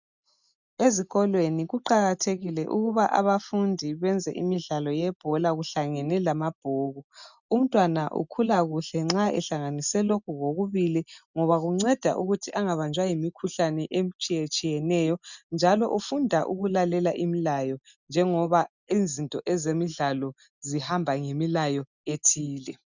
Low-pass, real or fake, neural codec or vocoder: 7.2 kHz; real; none